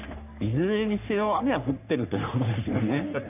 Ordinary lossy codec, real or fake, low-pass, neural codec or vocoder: none; fake; 3.6 kHz; codec, 44.1 kHz, 3.4 kbps, Pupu-Codec